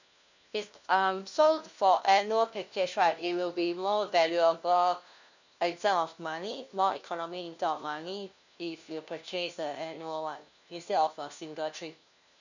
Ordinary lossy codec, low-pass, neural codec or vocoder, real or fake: none; 7.2 kHz; codec, 16 kHz, 1 kbps, FunCodec, trained on LibriTTS, 50 frames a second; fake